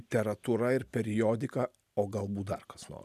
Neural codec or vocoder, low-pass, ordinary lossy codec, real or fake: none; 14.4 kHz; MP3, 96 kbps; real